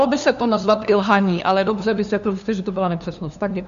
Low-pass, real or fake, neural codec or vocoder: 7.2 kHz; fake; codec, 16 kHz, 2 kbps, FunCodec, trained on LibriTTS, 25 frames a second